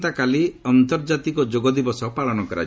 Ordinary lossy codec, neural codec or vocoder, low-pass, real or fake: none; none; none; real